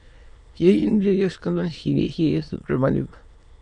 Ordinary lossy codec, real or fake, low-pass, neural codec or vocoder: MP3, 96 kbps; fake; 9.9 kHz; autoencoder, 22.05 kHz, a latent of 192 numbers a frame, VITS, trained on many speakers